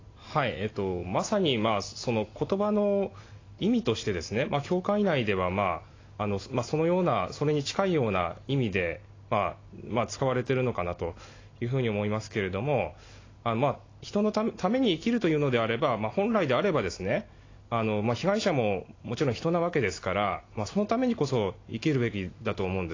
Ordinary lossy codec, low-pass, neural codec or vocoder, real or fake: AAC, 32 kbps; 7.2 kHz; none; real